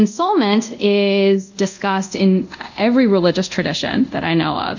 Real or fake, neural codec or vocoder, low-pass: fake; codec, 24 kHz, 0.5 kbps, DualCodec; 7.2 kHz